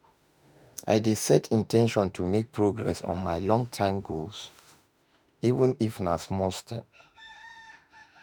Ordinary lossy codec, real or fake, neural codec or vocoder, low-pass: none; fake; autoencoder, 48 kHz, 32 numbers a frame, DAC-VAE, trained on Japanese speech; none